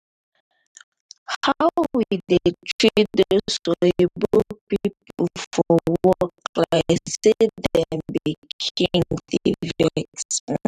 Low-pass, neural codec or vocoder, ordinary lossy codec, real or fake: 14.4 kHz; vocoder, 44.1 kHz, 128 mel bands every 512 samples, BigVGAN v2; none; fake